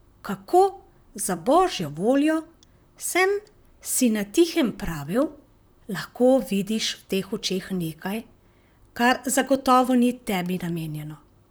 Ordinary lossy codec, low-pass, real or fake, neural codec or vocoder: none; none; fake; vocoder, 44.1 kHz, 128 mel bands, Pupu-Vocoder